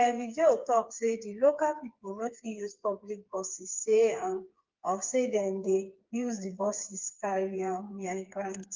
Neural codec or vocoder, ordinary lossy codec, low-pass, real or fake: codec, 16 kHz, 4 kbps, FreqCodec, smaller model; Opus, 32 kbps; 7.2 kHz; fake